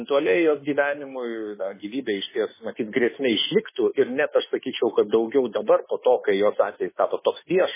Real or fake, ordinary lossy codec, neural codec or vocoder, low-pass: fake; MP3, 16 kbps; autoencoder, 48 kHz, 32 numbers a frame, DAC-VAE, trained on Japanese speech; 3.6 kHz